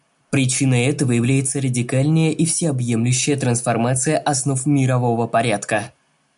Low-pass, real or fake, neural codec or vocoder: 10.8 kHz; real; none